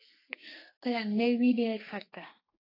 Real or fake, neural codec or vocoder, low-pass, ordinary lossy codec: fake; codec, 32 kHz, 1.9 kbps, SNAC; 5.4 kHz; AAC, 24 kbps